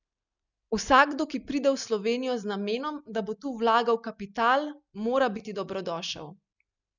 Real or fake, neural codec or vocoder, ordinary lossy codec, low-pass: real; none; none; 7.2 kHz